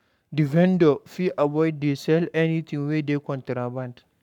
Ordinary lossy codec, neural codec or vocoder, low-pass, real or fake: none; codec, 44.1 kHz, 7.8 kbps, Pupu-Codec; 19.8 kHz; fake